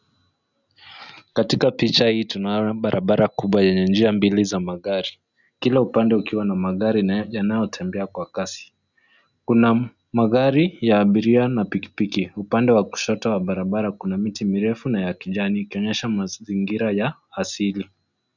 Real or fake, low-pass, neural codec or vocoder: real; 7.2 kHz; none